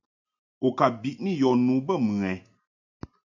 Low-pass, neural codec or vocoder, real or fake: 7.2 kHz; none; real